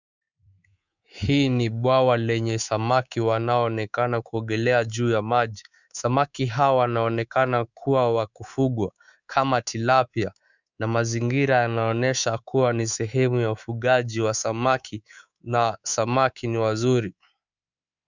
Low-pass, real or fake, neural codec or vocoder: 7.2 kHz; fake; codec, 24 kHz, 3.1 kbps, DualCodec